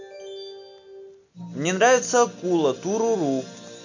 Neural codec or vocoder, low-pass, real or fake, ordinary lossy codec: none; 7.2 kHz; real; none